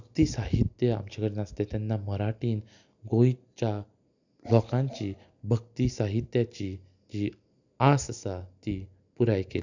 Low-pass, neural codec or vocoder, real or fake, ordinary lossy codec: 7.2 kHz; none; real; none